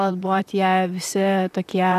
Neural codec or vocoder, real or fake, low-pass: vocoder, 44.1 kHz, 128 mel bands, Pupu-Vocoder; fake; 14.4 kHz